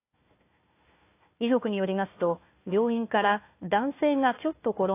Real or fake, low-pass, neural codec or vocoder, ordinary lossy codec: fake; 3.6 kHz; codec, 16 kHz, 1 kbps, FunCodec, trained on Chinese and English, 50 frames a second; AAC, 24 kbps